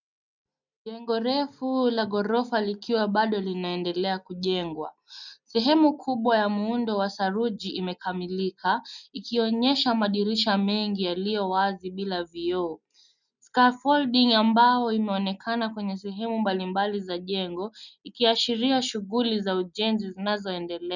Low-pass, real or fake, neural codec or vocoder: 7.2 kHz; real; none